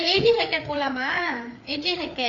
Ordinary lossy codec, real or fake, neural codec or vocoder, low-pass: none; fake; codec, 16 kHz, 4 kbps, FreqCodec, larger model; 7.2 kHz